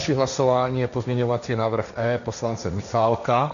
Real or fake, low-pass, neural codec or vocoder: fake; 7.2 kHz; codec, 16 kHz, 1.1 kbps, Voila-Tokenizer